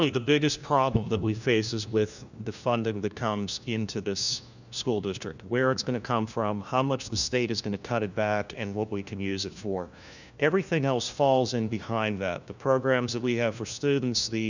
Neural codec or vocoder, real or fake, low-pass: codec, 16 kHz, 1 kbps, FunCodec, trained on LibriTTS, 50 frames a second; fake; 7.2 kHz